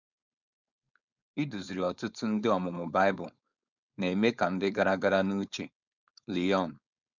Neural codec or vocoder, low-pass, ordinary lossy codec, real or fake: codec, 16 kHz, 4.8 kbps, FACodec; 7.2 kHz; none; fake